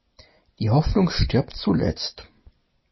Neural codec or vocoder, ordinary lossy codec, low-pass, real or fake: none; MP3, 24 kbps; 7.2 kHz; real